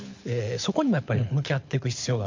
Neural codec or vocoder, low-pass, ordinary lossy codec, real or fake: codec, 24 kHz, 6 kbps, HILCodec; 7.2 kHz; MP3, 48 kbps; fake